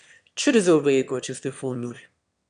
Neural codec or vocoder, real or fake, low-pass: autoencoder, 22.05 kHz, a latent of 192 numbers a frame, VITS, trained on one speaker; fake; 9.9 kHz